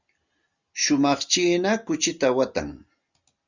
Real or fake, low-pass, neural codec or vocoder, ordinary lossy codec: real; 7.2 kHz; none; Opus, 64 kbps